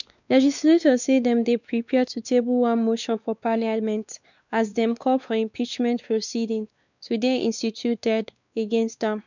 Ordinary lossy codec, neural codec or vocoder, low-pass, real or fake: none; codec, 16 kHz, 2 kbps, X-Codec, WavLM features, trained on Multilingual LibriSpeech; 7.2 kHz; fake